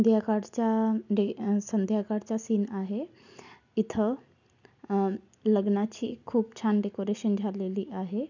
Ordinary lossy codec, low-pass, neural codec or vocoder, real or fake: none; 7.2 kHz; none; real